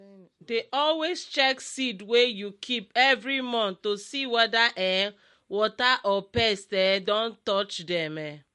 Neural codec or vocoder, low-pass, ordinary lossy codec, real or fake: none; 14.4 kHz; MP3, 48 kbps; real